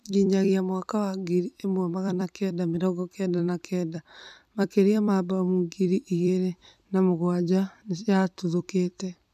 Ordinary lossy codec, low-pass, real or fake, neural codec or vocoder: none; 14.4 kHz; fake; vocoder, 44.1 kHz, 128 mel bands every 256 samples, BigVGAN v2